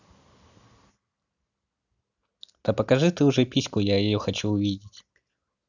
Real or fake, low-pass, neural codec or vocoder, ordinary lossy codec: real; 7.2 kHz; none; none